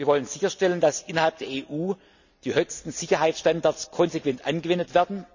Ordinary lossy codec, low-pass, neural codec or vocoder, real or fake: none; 7.2 kHz; none; real